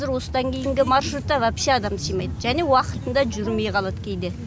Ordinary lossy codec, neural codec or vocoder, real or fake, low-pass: none; none; real; none